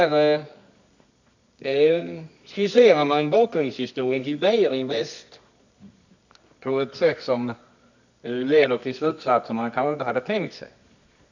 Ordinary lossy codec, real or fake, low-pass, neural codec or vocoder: none; fake; 7.2 kHz; codec, 24 kHz, 0.9 kbps, WavTokenizer, medium music audio release